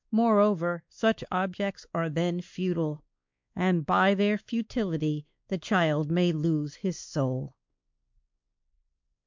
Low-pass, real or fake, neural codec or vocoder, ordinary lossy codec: 7.2 kHz; fake; codec, 16 kHz, 2 kbps, X-Codec, WavLM features, trained on Multilingual LibriSpeech; MP3, 64 kbps